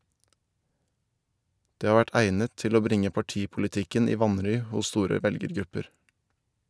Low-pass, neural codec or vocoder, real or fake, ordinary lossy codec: none; none; real; none